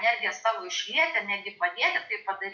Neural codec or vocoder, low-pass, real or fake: none; 7.2 kHz; real